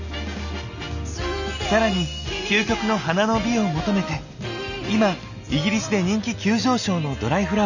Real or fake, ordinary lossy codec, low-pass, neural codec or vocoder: real; none; 7.2 kHz; none